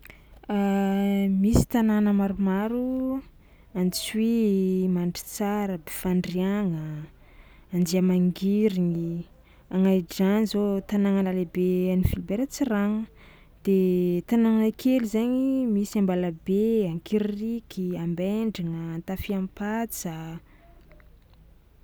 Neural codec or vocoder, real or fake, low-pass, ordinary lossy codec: none; real; none; none